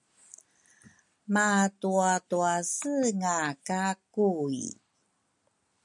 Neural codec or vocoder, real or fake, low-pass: none; real; 10.8 kHz